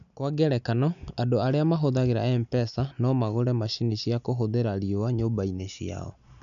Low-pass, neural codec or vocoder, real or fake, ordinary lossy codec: 7.2 kHz; none; real; none